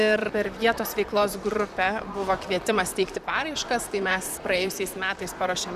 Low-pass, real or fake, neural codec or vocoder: 14.4 kHz; fake; vocoder, 44.1 kHz, 128 mel bands, Pupu-Vocoder